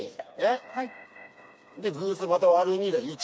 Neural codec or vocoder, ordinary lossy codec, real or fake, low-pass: codec, 16 kHz, 2 kbps, FreqCodec, smaller model; none; fake; none